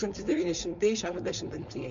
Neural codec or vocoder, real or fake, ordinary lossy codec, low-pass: codec, 16 kHz, 4.8 kbps, FACodec; fake; MP3, 48 kbps; 7.2 kHz